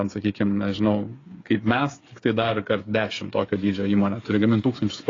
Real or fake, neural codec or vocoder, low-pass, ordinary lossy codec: fake; codec, 16 kHz, 8 kbps, FreqCodec, smaller model; 7.2 kHz; AAC, 32 kbps